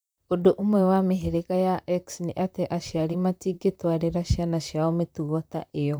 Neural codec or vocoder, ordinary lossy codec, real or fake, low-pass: vocoder, 44.1 kHz, 128 mel bands, Pupu-Vocoder; none; fake; none